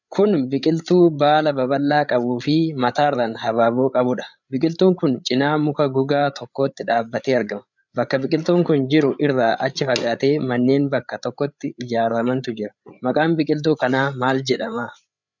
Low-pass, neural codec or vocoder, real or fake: 7.2 kHz; codec, 16 kHz, 8 kbps, FreqCodec, larger model; fake